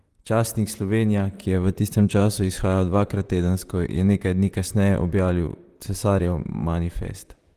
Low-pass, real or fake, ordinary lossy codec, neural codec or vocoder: 14.4 kHz; fake; Opus, 24 kbps; vocoder, 44.1 kHz, 128 mel bands, Pupu-Vocoder